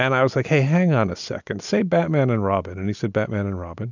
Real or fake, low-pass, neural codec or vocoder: real; 7.2 kHz; none